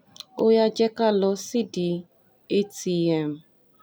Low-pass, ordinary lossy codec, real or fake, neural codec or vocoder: none; none; real; none